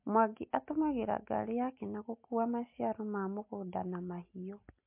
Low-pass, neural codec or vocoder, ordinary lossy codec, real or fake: 3.6 kHz; none; MP3, 32 kbps; real